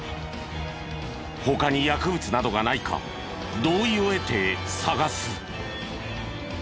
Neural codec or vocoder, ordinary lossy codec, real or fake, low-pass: none; none; real; none